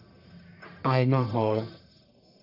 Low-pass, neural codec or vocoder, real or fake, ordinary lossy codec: 5.4 kHz; codec, 44.1 kHz, 1.7 kbps, Pupu-Codec; fake; none